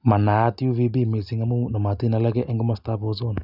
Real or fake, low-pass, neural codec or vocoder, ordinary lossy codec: real; 5.4 kHz; none; none